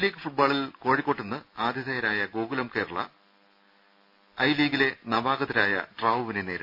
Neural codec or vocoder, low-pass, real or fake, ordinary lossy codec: none; 5.4 kHz; real; none